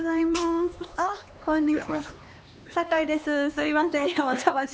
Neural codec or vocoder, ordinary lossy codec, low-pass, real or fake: codec, 16 kHz, 2 kbps, X-Codec, HuBERT features, trained on LibriSpeech; none; none; fake